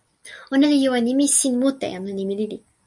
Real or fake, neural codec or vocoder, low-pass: real; none; 10.8 kHz